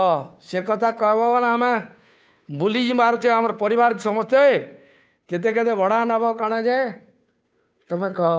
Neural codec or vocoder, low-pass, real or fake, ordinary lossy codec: codec, 16 kHz, 2 kbps, FunCodec, trained on Chinese and English, 25 frames a second; none; fake; none